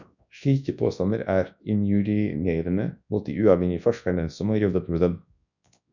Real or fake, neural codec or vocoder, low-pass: fake; codec, 24 kHz, 0.9 kbps, WavTokenizer, large speech release; 7.2 kHz